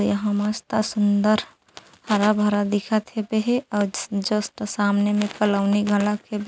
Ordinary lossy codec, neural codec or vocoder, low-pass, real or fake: none; none; none; real